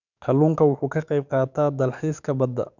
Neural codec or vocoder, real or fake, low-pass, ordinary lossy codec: codec, 44.1 kHz, 7.8 kbps, DAC; fake; 7.2 kHz; none